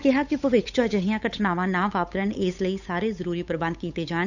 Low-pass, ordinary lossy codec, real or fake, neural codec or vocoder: 7.2 kHz; none; fake; codec, 16 kHz, 8 kbps, FunCodec, trained on Chinese and English, 25 frames a second